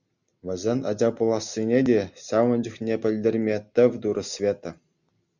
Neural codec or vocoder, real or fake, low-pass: none; real; 7.2 kHz